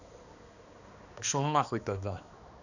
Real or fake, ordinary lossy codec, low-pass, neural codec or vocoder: fake; none; 7.2 kHz; codec, 16 kHz, 2 kbps, X-Codec, HuBERT features, trained on balanced general audio